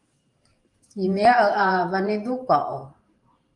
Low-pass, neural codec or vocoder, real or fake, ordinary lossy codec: 10.8 kHz; vocoder, 44.1 kHz, 128 mel bands every 512 samples, BigVGAN v2; fake; Opus, 32 kbps